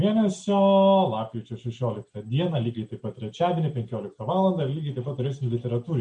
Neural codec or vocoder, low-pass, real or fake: none; 9.9 kHz; real